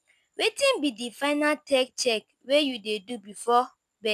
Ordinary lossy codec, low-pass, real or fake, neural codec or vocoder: none; 14.4 kHz; real; none